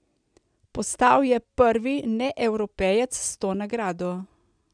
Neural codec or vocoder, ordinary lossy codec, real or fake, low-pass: none; none; real; 9.9 kHz